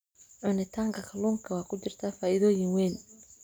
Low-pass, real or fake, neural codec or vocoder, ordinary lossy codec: none; real; none; none